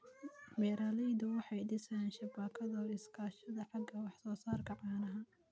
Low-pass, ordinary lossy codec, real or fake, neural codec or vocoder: none; none; real; none